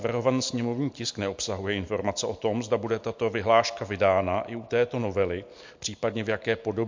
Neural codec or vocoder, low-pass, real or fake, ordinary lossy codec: none; 7.2 kHz; real; MP3, 48 kbps